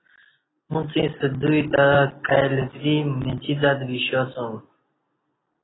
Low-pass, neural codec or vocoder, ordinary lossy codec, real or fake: 7.2 kHz; none; AAC, 16 kbps; real